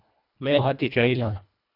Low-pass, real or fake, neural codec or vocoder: 5.4 kHz; fake; codec, 24 kHz, 1.5 kbps, HILCodec